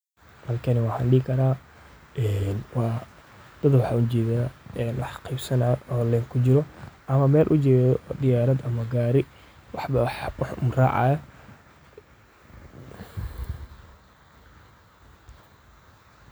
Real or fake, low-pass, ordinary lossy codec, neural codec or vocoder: real; none; none; none